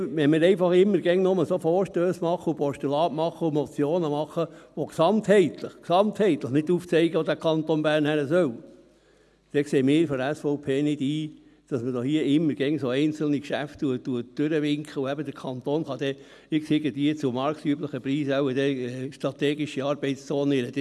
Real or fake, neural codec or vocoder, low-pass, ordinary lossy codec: real; none; none; none